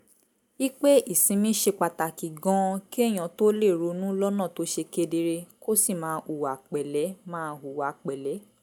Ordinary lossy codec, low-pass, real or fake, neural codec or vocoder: none; none; real; none